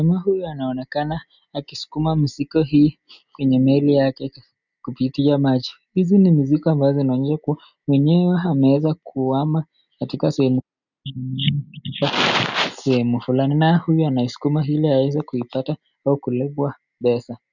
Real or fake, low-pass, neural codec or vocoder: real; 7.2 kHz; none